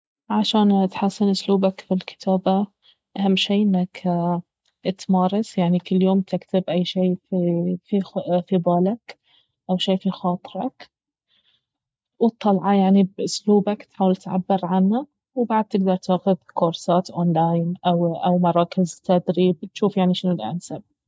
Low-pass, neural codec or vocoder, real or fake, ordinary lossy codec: none; none; real; none